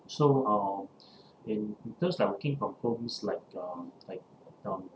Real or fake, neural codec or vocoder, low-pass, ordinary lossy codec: real; none; none; none